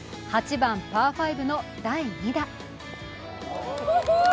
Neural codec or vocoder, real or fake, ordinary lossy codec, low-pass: none; real; none; none